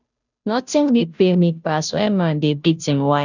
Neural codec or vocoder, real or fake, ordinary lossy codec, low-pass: codec, 16 kHz, 0.5 kbps, FunCodec, trained on Chinese and English, 25 frames a second; fake; none; 7.2 kHz